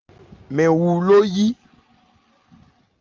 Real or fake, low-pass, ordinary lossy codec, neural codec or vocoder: real; 7.2 kHz; Opus, 32 kbps; none